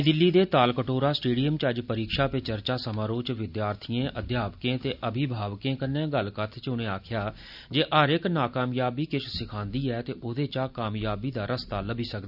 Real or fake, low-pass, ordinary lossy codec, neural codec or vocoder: real; 5.4 kHz; none; none